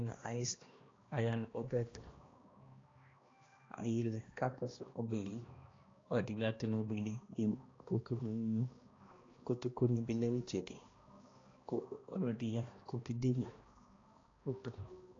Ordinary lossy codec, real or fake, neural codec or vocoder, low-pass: AAC, 32 kbps; fake; codec, 16 kHz, 1 kbps, X-Codec, HuBERT features, trained on balanced general audio; 7.2 kHz